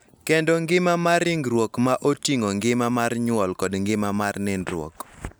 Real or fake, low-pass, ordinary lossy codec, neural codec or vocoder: real; none; none; none